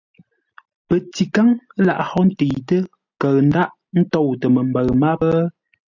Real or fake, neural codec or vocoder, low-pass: real; none; 7.2 kHz